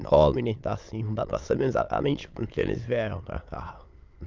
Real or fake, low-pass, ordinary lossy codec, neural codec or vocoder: fake; 7.2 kHz; Opus, 24 kbps; autoencoder, 22.05 kHz, a latent of 192 numbers a frame, VITS, trained on many speakers